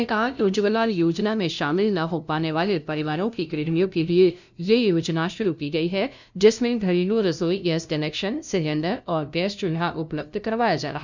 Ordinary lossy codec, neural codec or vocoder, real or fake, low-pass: none; codec, 16 kHz, 0.5 kbps, FunCodec, trained on LibriTTS, 25 frames a second; fake; 7.2 kHz